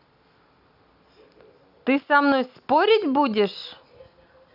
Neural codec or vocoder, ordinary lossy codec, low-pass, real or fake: none; none; 5.4 kHz; real